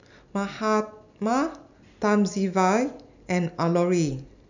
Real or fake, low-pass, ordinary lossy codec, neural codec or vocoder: real; 7.2 kHz; none; none